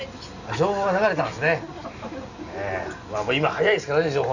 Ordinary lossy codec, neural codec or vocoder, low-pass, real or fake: none; none; 7.2 kHz; real